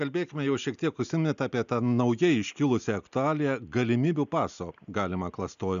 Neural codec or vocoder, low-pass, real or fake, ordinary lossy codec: none; 7.2 kHz; real; MP3, 96 kbps